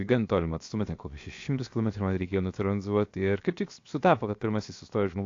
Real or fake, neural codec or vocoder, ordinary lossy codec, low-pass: fake; codec, 16 kHz, 0.7 kbps, FocalCodec; AAC, 48 kbps; 7.2 kHz